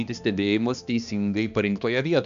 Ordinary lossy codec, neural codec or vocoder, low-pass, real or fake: Opus, 64 kbps; codec, 16 kHz, 2 kbps, X-Codec, HuBERT features, trained on balanced general audio; 7.2 kHz; fake